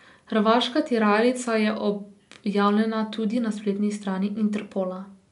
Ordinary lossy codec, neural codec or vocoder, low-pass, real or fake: MP3, 96 kbps; none; 10.8 kHz; real